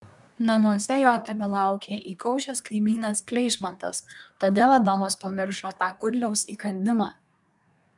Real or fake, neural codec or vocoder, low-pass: fake; codec, 24 kHz, 1 kbps, SNAC; 10.8 kHz